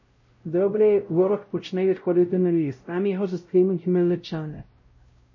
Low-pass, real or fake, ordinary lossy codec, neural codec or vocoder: 7.2 kHz; fake; MP3, 32 kbps; codec, 16 kHz, 0.5 kbps, X-Codec, WavLM features, trained on Multilingual LibriSpeech